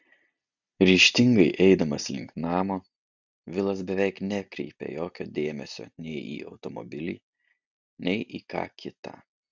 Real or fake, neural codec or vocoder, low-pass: real; none; 7.2 kHz